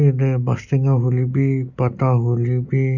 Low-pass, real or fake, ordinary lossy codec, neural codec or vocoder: 7.2 kHz; real; none; none